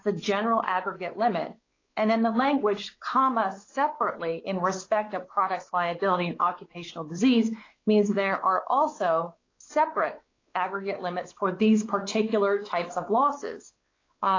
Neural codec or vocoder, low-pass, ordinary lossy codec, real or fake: codec, 16 kHz, 4 kbps, X-Codec, WavLM features, trained on Multilingual LibriSpeech; 7.2 kHz; AAC, 32 kbps; fake